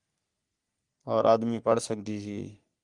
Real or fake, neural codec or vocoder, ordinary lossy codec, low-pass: fake; codec, 44.1 kHz, 3.4 kbps, Pupu-Codec; Opus, 32 kbps; 10.8 kHz